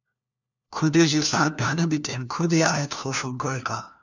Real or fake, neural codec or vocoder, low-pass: fake; codec, 16 kHz, 1 kbps, FunCodec, trained on LibriTTS, 50 frames a second; 7.2 kHz